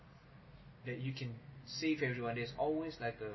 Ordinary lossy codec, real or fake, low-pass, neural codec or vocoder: MP3, 24 kbps; real; 7.2 kHz; none